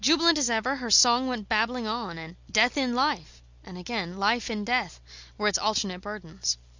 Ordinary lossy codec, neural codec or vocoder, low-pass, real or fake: Opus, 64 kbps; none; 7.2 kHz; real